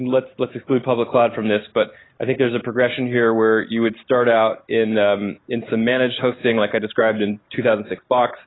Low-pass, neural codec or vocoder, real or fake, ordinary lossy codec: 7.2 kHz; none; real; AAC, 16 kbps